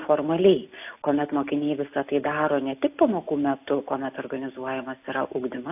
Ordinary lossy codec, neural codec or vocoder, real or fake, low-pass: AAC, 32 kbps; none; real; 3.6 kHz